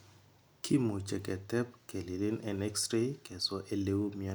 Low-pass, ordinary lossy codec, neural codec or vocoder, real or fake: none; none; none; real